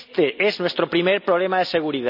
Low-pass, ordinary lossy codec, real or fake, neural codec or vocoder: 5.4 kHz; none; real; none